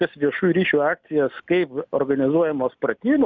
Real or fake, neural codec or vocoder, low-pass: real; none; 7.2 kHz